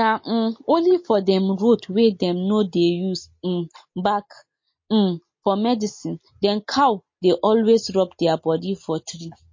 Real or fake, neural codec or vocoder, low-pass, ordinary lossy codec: real; none; 7.2 kHz; MP3, 32 kbps